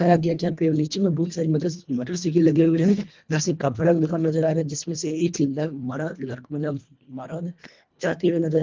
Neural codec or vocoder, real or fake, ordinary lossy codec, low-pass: codec, 24 kHz, 1.5 kbps, HILCodec; fake; Opus, 24 kbps; 7.2 kHz